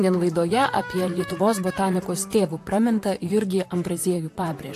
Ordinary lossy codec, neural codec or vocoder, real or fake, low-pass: AAC, 64 kbps; vocoder, 44.1 kHz, 128 mel bands, Pupu-Vocoder; fake; 14.4 kHz